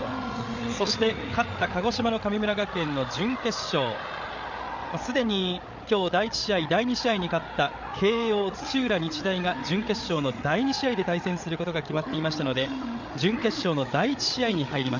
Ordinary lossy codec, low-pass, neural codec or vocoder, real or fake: none; 7.2 kHz; codec, 16 kHz, 8 kbps, FreqCodec, larger model; fake